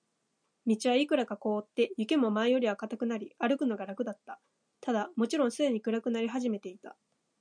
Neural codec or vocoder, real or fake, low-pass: none; real; 9.9 kHz